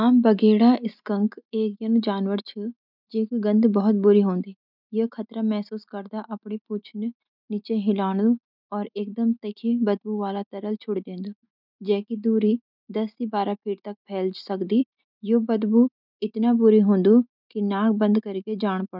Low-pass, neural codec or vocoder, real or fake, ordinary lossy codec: 5.4 kHz; none; real; none